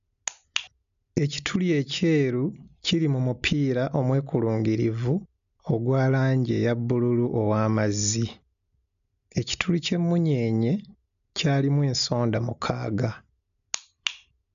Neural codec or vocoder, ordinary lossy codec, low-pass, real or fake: none; AAC, 96 kbps; 7.2 kHz; real